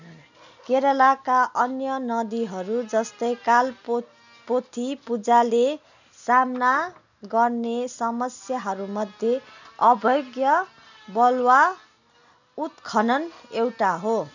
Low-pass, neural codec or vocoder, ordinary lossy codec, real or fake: 7.2 kHz; none; none; real